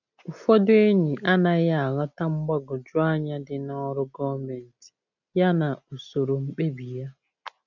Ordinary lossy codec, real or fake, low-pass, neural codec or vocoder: none; real; 7.2 kHz; none